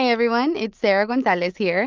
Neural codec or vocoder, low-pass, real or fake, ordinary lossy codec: none; 7.2 kHz; real; Opus, 24 kbps